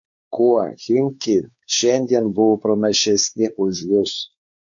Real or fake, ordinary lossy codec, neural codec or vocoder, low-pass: fake; AAC, 64 kbps; codec, 16 kHz, 4 kbps, X-Codec, WavLM features, trained on Multilingual LibriSpeech; 7.2 kHz